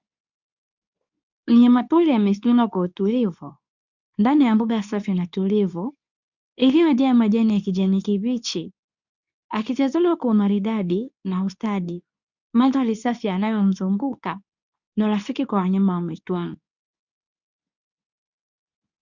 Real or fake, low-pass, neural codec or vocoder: fake; 7.2 kHz; codec, 24 kHz, 0.9 kbps, WavTokenizer, medium speech release version 2